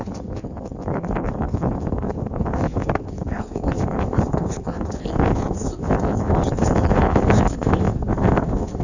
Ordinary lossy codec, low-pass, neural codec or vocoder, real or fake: none; 7.2 kHz; codec, 16 kHz in and 24 kHz out, 1.1 kbps, FireRedTTS-2 codec; fake